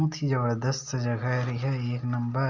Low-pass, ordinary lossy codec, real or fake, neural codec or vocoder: 7.2 kHz; Opus, 64 kbps; real; none